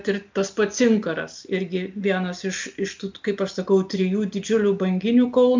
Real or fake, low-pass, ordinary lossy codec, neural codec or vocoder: real; 7.2 kHz; MP3, 64 kbps; none